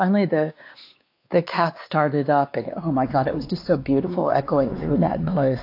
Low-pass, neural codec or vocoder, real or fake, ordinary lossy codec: 5.4 kHz; codec, 16 kHz, 2 kbps, X-Codec, WavLM features, trained on Multilingual LibriSpeech; fake; AAC, 32 kbps